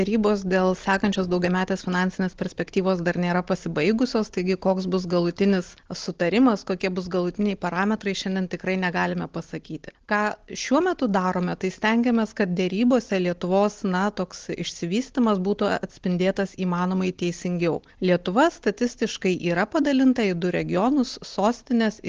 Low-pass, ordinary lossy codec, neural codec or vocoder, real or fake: 7.2 kHz; Opus, 16 kbps; none; real